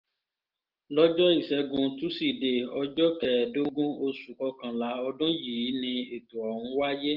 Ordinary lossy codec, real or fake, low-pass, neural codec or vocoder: Opus, 24 kbps; real; 5.4 kHz; none